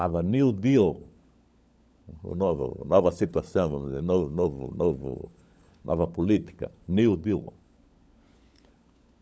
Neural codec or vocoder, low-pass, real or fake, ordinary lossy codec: codec, 16 kHz, 16 kbps, FunCodec, trained on LibriTTS, 50 frames a second; none; fake; none